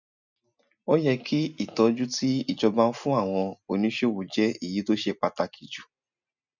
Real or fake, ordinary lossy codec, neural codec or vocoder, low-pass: real; none; none; 7.2 kHz